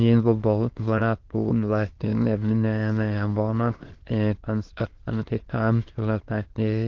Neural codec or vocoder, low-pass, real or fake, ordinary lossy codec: autoencoder, 22.05 kHz, a latent of 192 numbers a frame, VITS, trained on many speakers; 7.2 kHz; fake; Opus, 16 kbps